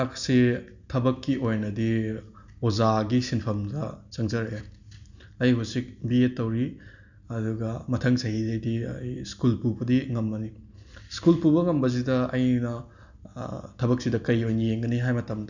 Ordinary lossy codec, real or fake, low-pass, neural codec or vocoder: none; real; 7.2 kHz; none